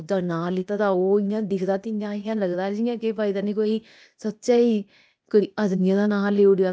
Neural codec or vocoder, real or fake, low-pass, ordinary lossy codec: codec, 16 kHz, 0.8 kbps, ZipCodec; fake; none; none